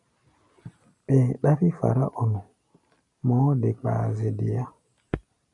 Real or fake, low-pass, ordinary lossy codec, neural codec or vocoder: real; 10.8 kHz; MP3, 96 kbps; none